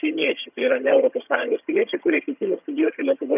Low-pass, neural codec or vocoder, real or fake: 3.6 kHz; vocoder, 22.05 kHz, 80 mel bands, HiFi-GAN; fake